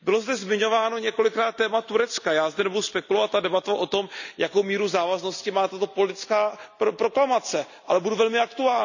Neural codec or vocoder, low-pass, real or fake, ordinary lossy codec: none; 7.2 kHz; real; none